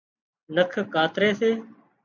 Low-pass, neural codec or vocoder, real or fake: 7.2 kHz; none; real